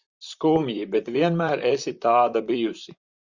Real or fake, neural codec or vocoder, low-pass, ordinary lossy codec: fake; vocoder, 44.1 kHz, 128 mel bands, Pupu-Vocoder; 7.2 kHz; Opus, 64 kbps